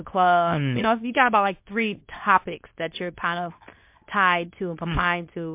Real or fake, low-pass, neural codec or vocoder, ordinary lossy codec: fake; 3.6 kHz; codec, 24 kHz, 0.9 kbps, WavTokenizer, medium speech release version 2; MP3, 32 kbps